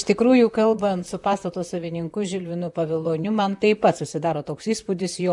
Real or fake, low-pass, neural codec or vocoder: fake; 10.8 kHz; vocoder, 44.1 kHz, 128 mel bands, Pupu-Vocoder